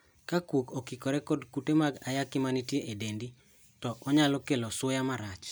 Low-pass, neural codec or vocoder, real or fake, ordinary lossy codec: none; none; real; none